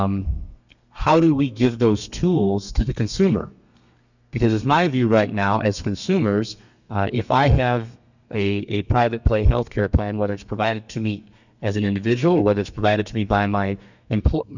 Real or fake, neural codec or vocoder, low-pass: fake; codec, 32 kHz, 1.9 kbps, SNAC; 7.2 kHz